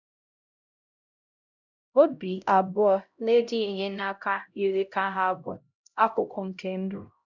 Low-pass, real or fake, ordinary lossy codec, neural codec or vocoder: 7.2 kHz; fake; none; codec, 16 kHz, 0.5 kbps, X-Codec, HuBERT features, trained on LibriSpeech